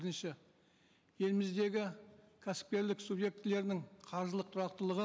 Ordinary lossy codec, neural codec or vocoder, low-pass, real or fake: none; none; none; real